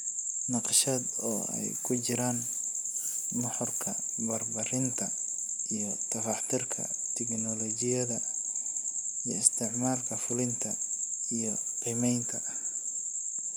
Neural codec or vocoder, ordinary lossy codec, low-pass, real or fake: none; none; none; real